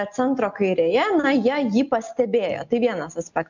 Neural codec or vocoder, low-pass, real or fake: none; 7.2 kHz; real